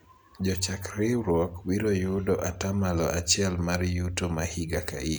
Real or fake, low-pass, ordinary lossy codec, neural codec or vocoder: real; none; none; none